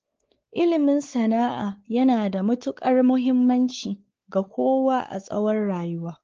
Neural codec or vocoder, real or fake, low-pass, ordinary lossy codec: codec, 16 kHz, 4 kbps, X-Codec, WavLM features, trained on Multilingual LibriSpeech; fake; 7.2 kHz; Opus, 16 kbps